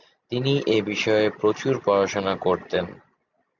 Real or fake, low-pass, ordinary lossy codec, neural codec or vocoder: real; 7.2 kHz; MP3, 64 kbps; none